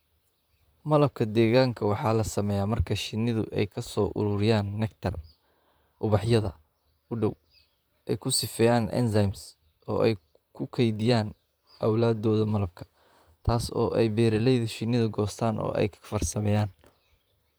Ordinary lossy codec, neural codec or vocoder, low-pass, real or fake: none; vocoder, 44.1 kHz, 128 mel bands, Pupu-Vocoder; none; fake